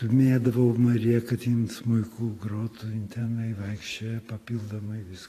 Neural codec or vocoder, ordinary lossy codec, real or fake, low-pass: vocoder, 44.1 kHz, 128 mel bands every 512 samples, BigVGAN v2; AAC, 48 kbps; fake; 14.4 kHz